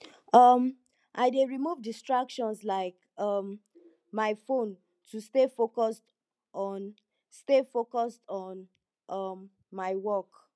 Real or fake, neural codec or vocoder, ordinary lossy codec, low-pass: real; none; none; none